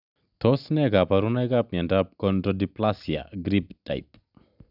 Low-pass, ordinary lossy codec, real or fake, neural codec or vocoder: 5.4 kHz; none; real; none